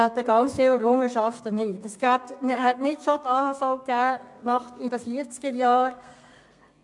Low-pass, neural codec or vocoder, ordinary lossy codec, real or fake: 10.8 kHz; codec, 32 kHz, 1.9 kbps, SNAC; MP3, 64 kbps; fake